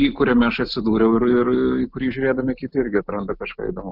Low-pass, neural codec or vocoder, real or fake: 5.4 kHz; none; real